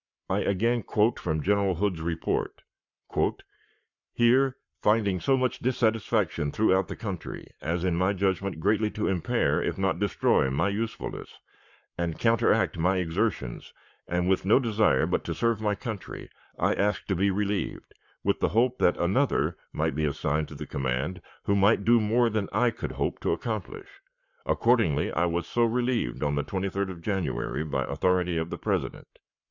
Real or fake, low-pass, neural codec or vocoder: fake; 7.2 kHz; codec, 44.1 kHz, 7.8 kbps, Pupu-Codec